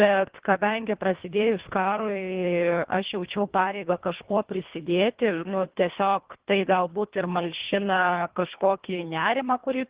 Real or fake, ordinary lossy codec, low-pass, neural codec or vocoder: fake; Opus, 16 kbps; 3.6 kHz; codec, 24 kHz, 1.5 kbps, HILCodec